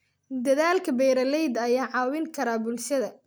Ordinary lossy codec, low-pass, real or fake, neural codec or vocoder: none; none; real; none